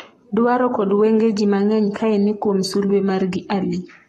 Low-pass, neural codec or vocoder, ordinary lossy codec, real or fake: 19.8 kHz; codec, 44.1 kHz, 7.8 kbps, Pupu-Codec; AAC, 32 kbps; fake